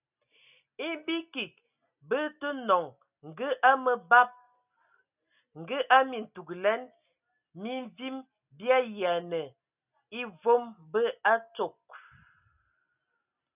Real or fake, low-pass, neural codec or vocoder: real; 3.6 kHz; none